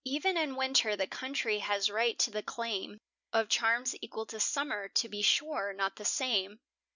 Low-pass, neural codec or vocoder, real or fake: 7.2 kHz; none; real